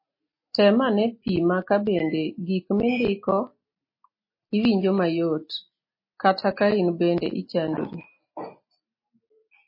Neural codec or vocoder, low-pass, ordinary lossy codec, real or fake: none; 5.4 kHz; MP3, 24 kbps; real